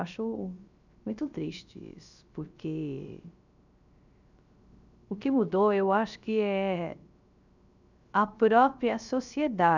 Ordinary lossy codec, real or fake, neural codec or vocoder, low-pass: none; fake; codec, 16 kHz, 0.3 kbps, FocalCodec; 7.2 kHz